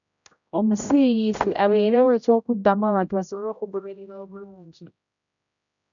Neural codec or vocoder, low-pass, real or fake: codec, 16 kHz, 0.5 kbps, X-Codec, HuBERT features, trained on general audio; 7.2 kHz; fake